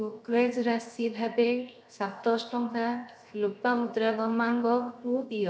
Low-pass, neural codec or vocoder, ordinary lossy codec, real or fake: none; codec, 16 kHz, 0.7 kbps, FocalCodec; none; fake